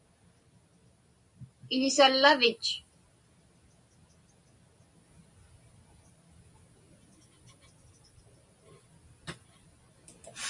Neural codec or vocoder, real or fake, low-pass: none; real; 10.8 kHz